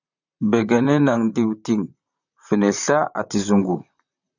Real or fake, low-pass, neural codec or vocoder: fake; 7.2 kHz; vocoder, 44.1 kHz, 128 mel bands, Pupu-Vocoder